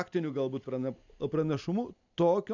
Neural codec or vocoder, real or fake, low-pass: none; real; 7.2 kHz